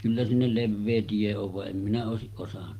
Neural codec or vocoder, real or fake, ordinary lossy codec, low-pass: none; real; Opus, 24 kbps; 14.4 kHz